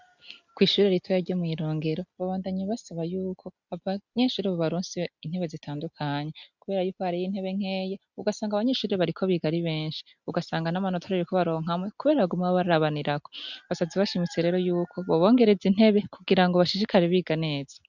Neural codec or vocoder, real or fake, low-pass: none; real; 7.2 kHz